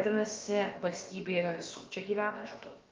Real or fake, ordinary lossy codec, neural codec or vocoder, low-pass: fake; Opus, 32 kbps; codec, 16 kHz, about 1 kbps, DyCAST, with the encoder's durations; 7.2 kHz